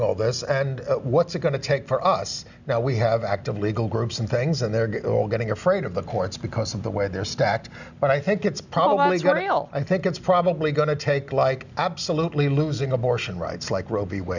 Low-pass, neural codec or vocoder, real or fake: 7.2 kHz; none; real